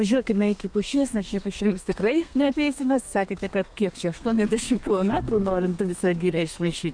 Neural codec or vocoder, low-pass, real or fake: codec, 32 kHz, 1.9 kbps, SNAC; 9.9 kHz; fake